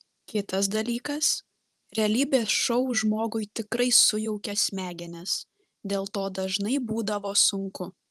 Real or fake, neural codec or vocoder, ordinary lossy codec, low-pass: fake; vocoder, 44.1 kHz, 128 mel bands every 512 samples, BigVGAN v2; Opus, 32 kbps; 14.4 kHz